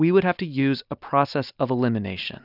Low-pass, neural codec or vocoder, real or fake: 5.4 kHz; none; real